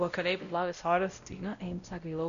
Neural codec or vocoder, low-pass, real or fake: codec, 16 kHz, 0.5 kbps, X-Codec, WavLM features, trained on Multilingual LibriSpeech; 7.2 kHz; fake